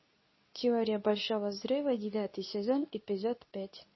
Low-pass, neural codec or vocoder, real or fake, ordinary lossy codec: 7.2 kHz; codec, 24 kHz, 0.9 kbps, WavTokenizer, medium speech release version 2; fake; MP3, 24 kbps